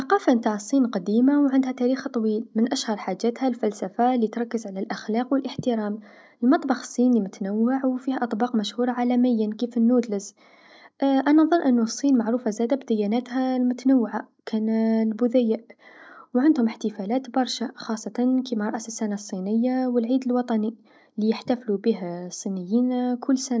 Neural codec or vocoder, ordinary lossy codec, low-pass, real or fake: none; none; none; real